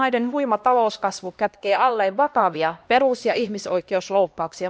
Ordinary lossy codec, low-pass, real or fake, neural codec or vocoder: none; none; fake; codec, 16 kHz, 1 kbps, X-Codec, HuBERT features, trained on LibriSpeech